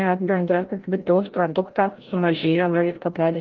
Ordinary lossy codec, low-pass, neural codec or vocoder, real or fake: Opus, 16 kbps; 7.2 kHz; codec, 16 kHz, 0.5 kbps, FreqCodec, larger model; fake